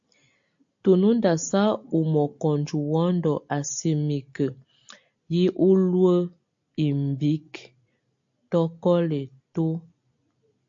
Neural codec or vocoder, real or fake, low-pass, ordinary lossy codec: none; real; 7.2 kHz; AAC, 64 kbps